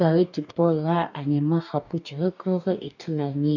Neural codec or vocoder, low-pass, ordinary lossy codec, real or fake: codec, 44.1 kHz, 2.6 kbps, DAC; 7.2 kHz; none; fake